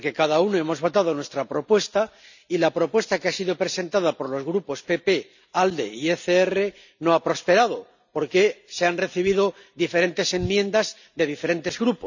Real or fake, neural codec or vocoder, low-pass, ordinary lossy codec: real; none; 7.2 kHz; none